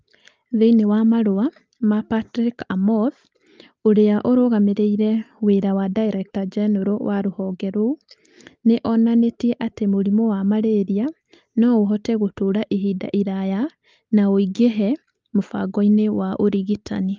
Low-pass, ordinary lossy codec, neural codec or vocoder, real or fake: 7.2 kHz; Opus, 24 kbps; none; real